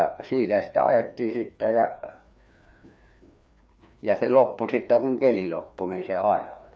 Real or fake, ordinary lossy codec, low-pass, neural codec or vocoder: fake; none; none; codec, 16 kHz, 2 kbps, FreqCodec, larger model